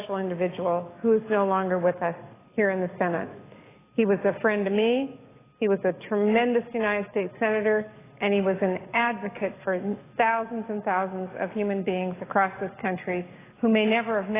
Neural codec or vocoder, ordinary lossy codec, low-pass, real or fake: codec, 44.1 kHz, 7.8 kbps, DAC; AAC, 16 kbps; 3.6 kHz; fake